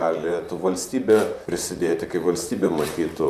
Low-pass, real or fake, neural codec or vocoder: 14.4 kHz; fake; vocoder, 44.1 kHz, 128 mel bands, Pupu-Vocoder